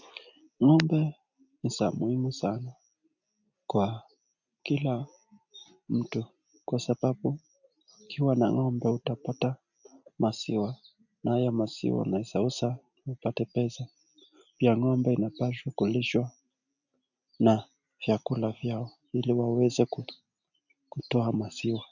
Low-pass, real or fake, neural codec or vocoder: 7.2 kHz; real; none